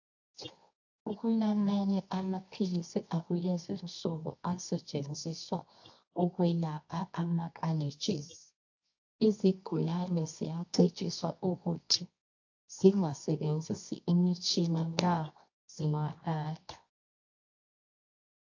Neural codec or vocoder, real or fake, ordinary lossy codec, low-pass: codec, 24 kHz, 0.9 kbps, WavTokenizer, medium music audio release; fake; AAC, 48 kbps; 7.2 kHz